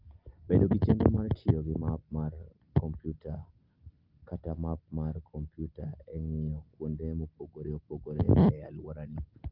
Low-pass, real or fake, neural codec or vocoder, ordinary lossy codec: 5.4 kHz; real; none; Opus, 32 kbps